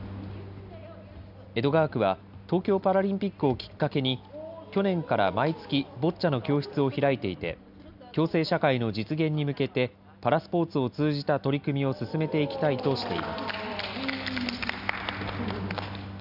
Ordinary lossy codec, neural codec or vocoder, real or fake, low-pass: none; none; real; 5.4 kHz